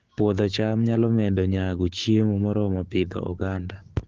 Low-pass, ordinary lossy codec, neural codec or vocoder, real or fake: 7.2 kHz; Opus, 16 kbps; codec, 16 kHz, 6 kbps, DAC; fake